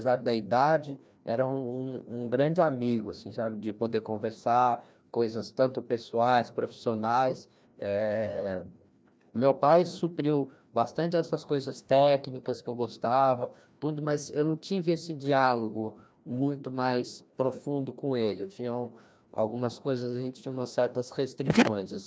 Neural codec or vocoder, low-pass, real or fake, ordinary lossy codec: codec, 16 kHz, 1 kbps, FreqCodec, larger model; none; fake; none